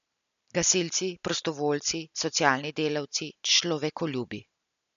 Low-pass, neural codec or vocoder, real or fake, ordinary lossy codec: 7.2 kHz; none; real; none